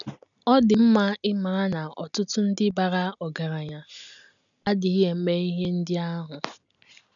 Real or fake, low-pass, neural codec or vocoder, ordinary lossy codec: real; 7.2 kHz; none; none